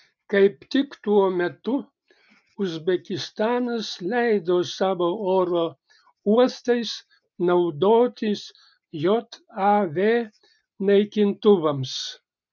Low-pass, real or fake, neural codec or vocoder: 7.2 kHz; real; none